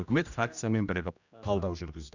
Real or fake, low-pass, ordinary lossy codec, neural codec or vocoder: fake; 7.2 kHz; none; codec, 16 kHz, 1 kbps, X-Codec, HuBERT features, trained on general audio